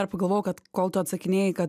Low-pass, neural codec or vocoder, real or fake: 14.4 kHz; none; real